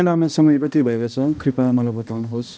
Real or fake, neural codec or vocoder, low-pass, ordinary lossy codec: fake; codec, 16 kHz, 1 kbps, X-Codec, HuBERT features, trained on balanced general audio; none; none